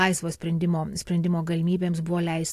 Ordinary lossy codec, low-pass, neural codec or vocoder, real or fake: AAC, 64 kbps; 14.4 kHz; none; real